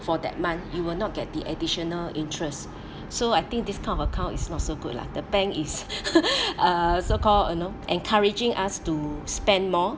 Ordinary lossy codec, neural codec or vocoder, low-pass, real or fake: none; none; none; real